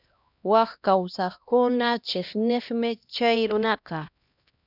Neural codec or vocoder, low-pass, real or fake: codec, 16 kHz, 1 kbps, X-Codec, HuBERT features, trained on LibriSpeech; 5.4 kHz; fake